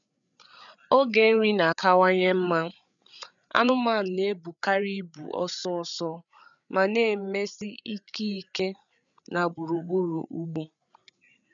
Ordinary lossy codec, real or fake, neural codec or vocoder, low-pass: none; fake; codec, 16 kHz, 8 kbps, FreqCodec, larger model; 7.2 kHz